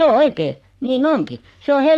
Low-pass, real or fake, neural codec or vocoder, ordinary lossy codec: 14.4 kHz; fake; codec, 44.1 kHz, 3.4 kbps, Pupu-Codec; none